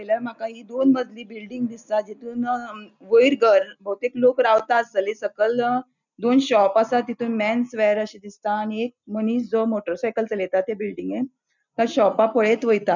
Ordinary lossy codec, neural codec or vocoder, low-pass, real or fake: none; none; 7.2 kHz; real